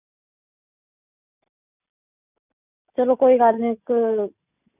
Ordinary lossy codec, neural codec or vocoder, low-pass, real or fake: none; none; 3.6 kHz; real